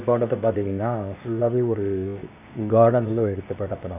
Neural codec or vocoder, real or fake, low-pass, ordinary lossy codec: codec, 16 kHz, 0.8 kbps, ZipCodec; fake; 3.6 kHz; none